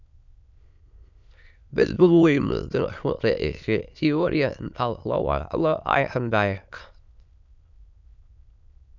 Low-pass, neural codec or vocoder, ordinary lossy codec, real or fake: 7.2 kHz; autoencoder, 22.05 kHz, a latent of 192 numbers a frame, VITS, trained on many speakers; none; fake